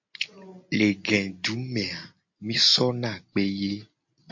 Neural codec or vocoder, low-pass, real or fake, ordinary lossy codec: none; 7.2 kHz; real; MP3, 48 kbps